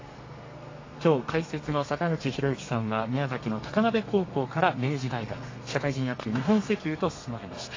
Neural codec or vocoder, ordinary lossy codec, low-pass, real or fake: codec, 32 kHz, 1.9 kbps, SNAC; AAC, 32 kbps; 7.2 kHz; fake